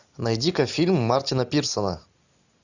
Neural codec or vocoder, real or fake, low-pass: none; real; 7.2 kHz